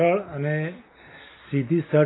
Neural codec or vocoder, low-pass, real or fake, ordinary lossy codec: none; 7.2 kHz; real; AAC, 16 kbps